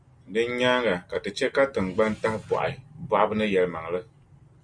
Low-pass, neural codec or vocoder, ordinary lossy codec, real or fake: 9.9 kHz; none; Opus, 64 kbps; real